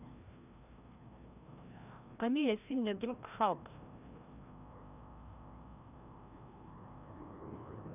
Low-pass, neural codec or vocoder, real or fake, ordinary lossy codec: 3.6 kHz; codec, 16 kHz, 1 kbps, FreqCodec, larger model; fake; none